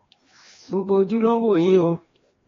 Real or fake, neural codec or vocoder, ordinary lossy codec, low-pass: fake; codec, 16 kHz, 1 kbps, FreqCodec, larger model; AAC, 32 kbps; 7.2 kHz